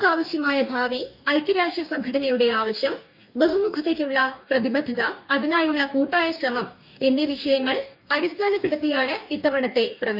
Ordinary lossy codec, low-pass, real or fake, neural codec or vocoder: AAC, 48 kbps; 5.4 kHz; fake; codec, 44.1 kHz, 2.6 kbps, DAC